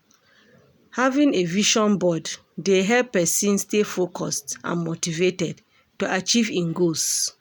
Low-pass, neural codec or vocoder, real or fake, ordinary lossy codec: none; none; real; none